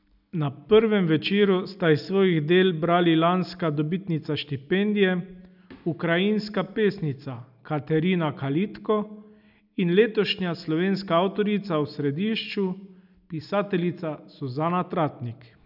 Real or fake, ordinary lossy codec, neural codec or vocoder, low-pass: real; none; none; 5.4 kHz